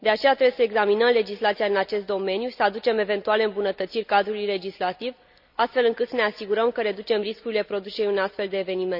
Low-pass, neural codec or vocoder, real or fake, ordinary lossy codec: 5.4 kHz; none; real; none